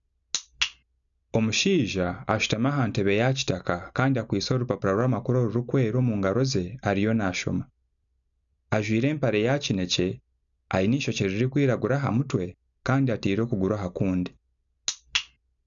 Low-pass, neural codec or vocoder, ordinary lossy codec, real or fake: 7.2 kHz; none; none; real